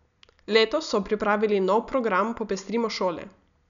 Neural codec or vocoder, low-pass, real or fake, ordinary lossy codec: none; 7.2 kHz; real; none